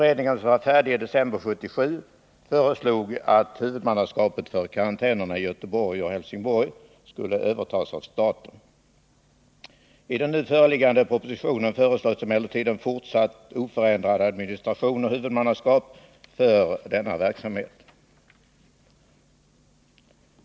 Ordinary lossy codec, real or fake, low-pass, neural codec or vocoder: none; real; none; none